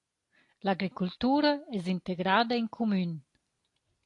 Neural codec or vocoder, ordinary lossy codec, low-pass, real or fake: none; AAC, 48 kbps; 10.8 kHz; real